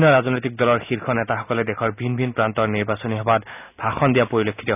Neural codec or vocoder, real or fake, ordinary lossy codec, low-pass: none; real; none; 3.6 kHz